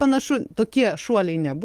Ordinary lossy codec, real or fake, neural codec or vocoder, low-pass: Opus, 24 kbps; fake; codec, 44.1 kHz, 7.8 kbps, DAC; 14.4 kHz